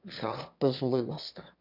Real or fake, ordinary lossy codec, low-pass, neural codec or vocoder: fake; none; 5.4 kHz; autoencoder, 22.05 kHz, a latent of 192 numbers a frame, VITS, trained on one speaker